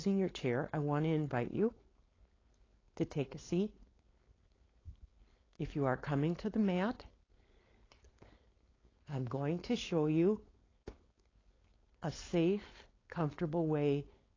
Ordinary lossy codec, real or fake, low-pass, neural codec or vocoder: AAC, 32 kbps; fake; 7.2 kHz; codec, 16 kHz, 4.8 kbps, FACodec